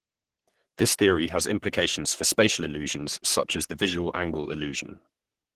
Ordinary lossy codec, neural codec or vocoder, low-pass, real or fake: Opus, 16 kbps; codec, 44.1 kHz, 3.4 kbps, Pupu-Codec; 14.4 kHz; fake